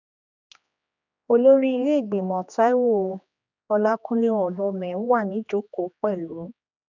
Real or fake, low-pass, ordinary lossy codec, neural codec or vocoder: fake; 7.2 kHz; none; codec, 16 kHz, 2 kbps, X-Codec, HuBERT features, trained on general audio